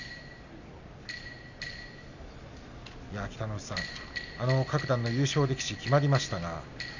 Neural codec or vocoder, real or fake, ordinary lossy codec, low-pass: none; real; none; 7.2 kHz